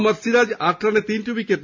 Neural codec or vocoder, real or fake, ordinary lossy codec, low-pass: none; real; MP3, 64 kbps; 7.2 kHz